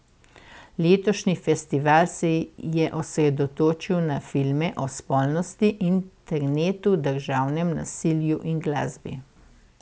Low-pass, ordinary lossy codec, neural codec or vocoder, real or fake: none; none; none; real